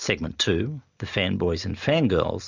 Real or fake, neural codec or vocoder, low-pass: real; none; 7.2 kHz